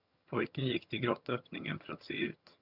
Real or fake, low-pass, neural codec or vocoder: fake; 5.4 kHz; vocoder, 22.05 kHz, 80 mel bands, HiFi-GAN